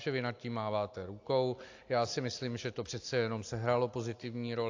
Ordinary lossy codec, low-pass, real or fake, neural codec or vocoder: AAC, 48 kbps; 7.2 kHz; real; none